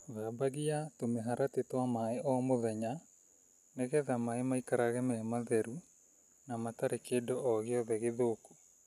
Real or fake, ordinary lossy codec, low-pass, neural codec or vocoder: real; none; 14.4 kHz; none